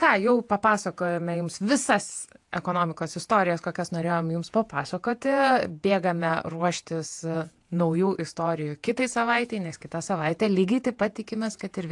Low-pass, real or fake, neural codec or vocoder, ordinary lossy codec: 10.8 kHz; fake; vocoder, 48 kHz, 128 mel bands, Vocos; AAC, 64 kbps